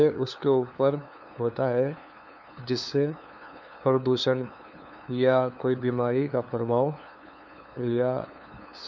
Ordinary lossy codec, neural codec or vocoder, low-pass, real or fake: none; codec, 16 kHz, 2 kbps, FunCodec, trained on LibriTTS, 25 frames a second; 7.2 kHz; fake